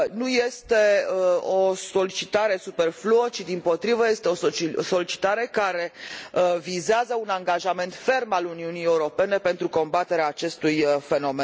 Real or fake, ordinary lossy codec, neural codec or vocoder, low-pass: real; none; none; none